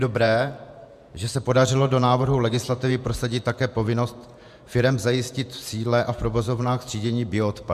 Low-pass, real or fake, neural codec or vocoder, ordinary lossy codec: 14.4 kHz; fake; vocoder, 48 kHz, 128 mel bands, Vocos; MP3, 96 kbps